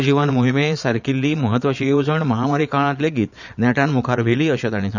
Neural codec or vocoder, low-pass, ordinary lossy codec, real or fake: vocoder, 22.05 kHz, 80 mel bands, Vocos; 7.2 kHz; none; fake